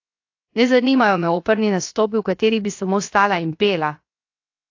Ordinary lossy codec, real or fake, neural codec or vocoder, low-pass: AAC, 48 kbps; fake; codec, 16 kHz, 0.7 kbps, FocalCodec; 7.2 kHz